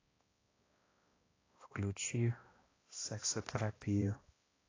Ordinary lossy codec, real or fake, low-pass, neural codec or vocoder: AAC, 32 kbps; fake; 7.2 kHz; codec, 16 kHz, 1 kbps, X-Codec, HuBERT features, trained on balanced general audio